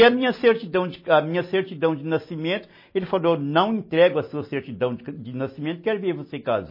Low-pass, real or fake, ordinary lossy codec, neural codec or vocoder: 5.4 kHz; real; MP3, 24 kbps; none